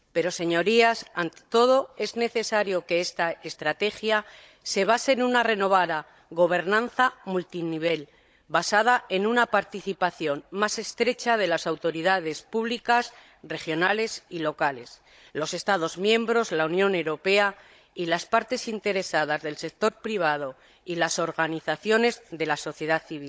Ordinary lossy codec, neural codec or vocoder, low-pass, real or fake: none; codec, 16 kHz, 16 kbps, FunCodec, trained on Chinese and English, 50 frames a second; none; fake